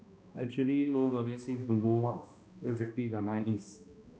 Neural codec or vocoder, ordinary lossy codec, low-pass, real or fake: codec, 16 kHz, 1 kbps, X-Codec, HuBERT features, trained on balanced general audio; none; none; fake